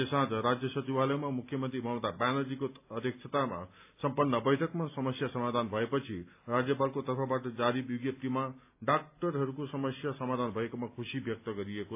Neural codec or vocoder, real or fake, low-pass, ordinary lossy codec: none; real; 3.6 kHz; AAC, 32 kbps